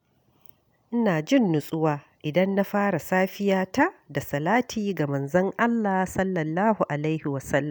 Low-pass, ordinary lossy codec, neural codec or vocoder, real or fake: none; none; none; real